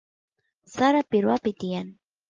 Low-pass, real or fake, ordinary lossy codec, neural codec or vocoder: 7.2 kHz; real; Opus, 32 kbps; none